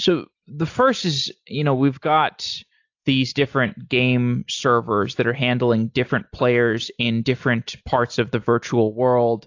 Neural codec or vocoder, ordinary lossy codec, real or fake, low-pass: none; AAC, 48 kbps; real; 7.2 kHz